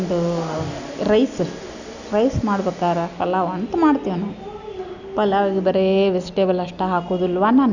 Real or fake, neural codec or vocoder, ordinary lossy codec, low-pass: real; none; none; 7.2 kHz